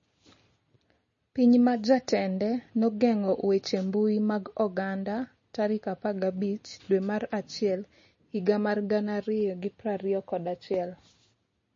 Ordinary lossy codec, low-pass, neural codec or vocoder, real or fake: MP3, 32 kbps; 7.2 kHz; none; real